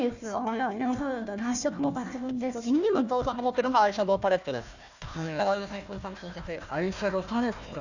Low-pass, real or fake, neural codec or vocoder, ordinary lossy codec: 7.2 kHz; fake; codec, 16 kHz, 1 kbps, FunCodec, trained on Chinese and English, 50 frames a second; none